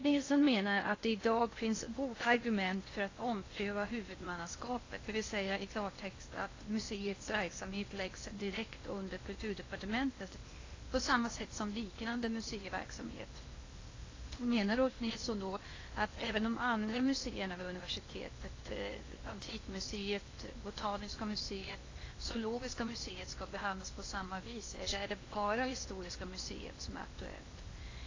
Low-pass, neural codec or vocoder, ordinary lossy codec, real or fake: 7.2 kHz; codec, 16 kHz in and 24 kHz out, 0.8 kbps, FocalCodec, streaming, 65536 codes; AAC, 32 kbps; fake